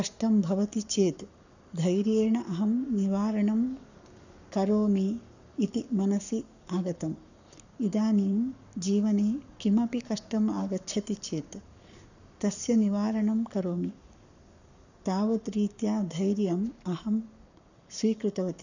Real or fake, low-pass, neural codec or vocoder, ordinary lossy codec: fake; 7.2 kHz; codec, 44.1 kHz, 7.8 kbps, Pupu-Codec; none